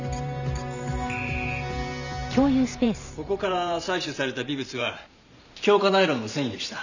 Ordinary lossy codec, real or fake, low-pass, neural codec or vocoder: none; fake; 7.2 kHz; vocoder, 44.1 kHz, 128 mel bands every 512 samples, BigVGAN v2